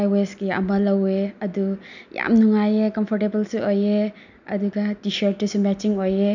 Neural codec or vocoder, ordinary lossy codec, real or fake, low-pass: none; none; real; 7.2 kHz